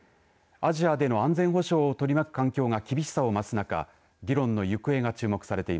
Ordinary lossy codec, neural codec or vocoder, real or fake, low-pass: none; none; real; none